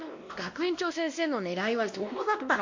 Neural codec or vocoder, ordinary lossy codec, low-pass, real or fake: codec, 16 kHz, 1 kbps, X-Codec, WavLM features, trained on Multilingual LibriSpeech; MP3, 48 kbps; 7.2 kHz; fake